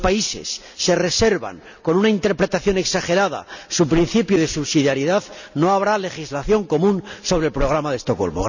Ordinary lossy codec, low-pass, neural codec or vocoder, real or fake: none; 7.2 kHz; none; real